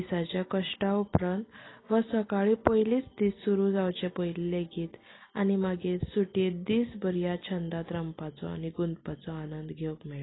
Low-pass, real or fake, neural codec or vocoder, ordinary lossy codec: 7.2 kHz; real; none; AAC, 16 kbps